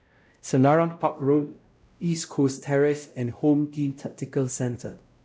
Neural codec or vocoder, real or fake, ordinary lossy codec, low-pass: codec, 16 kHz, 0.5 kbps, X-Codec, WavLM features, trained on Multilingual LibriSpeech; fake; none; none